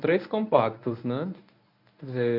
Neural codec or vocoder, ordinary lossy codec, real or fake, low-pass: codec, 24 kHz, 0.9 kbps, WavTokenizer, medium speech release version 1; none; fake; 5.4 kHz